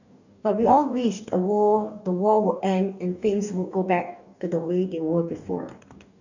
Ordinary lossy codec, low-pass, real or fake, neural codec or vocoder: none; 7.2 kHz; fake; codec, 44.1 kHz, 2.6 kbps, DAC